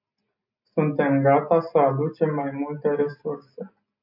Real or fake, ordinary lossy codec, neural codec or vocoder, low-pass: real; MP3, 48 kbps; none; 5.4 kHz